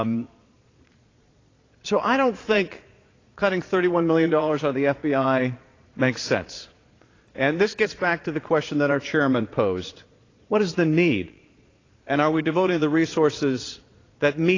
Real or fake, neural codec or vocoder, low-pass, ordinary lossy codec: fake; vocoder, 22.05 kHz, 80 mel bands, Vocos; 7.2 kHz; AAC, 32 kbps